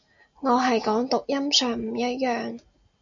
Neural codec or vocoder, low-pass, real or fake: none; 7.2 kHz; real